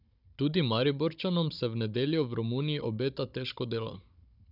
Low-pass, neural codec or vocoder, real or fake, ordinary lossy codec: 5.4 kHz; codec, 16 kHz, 16 kbps, FunCodec, trained on Chinese and English, 50 frames a second; fake; none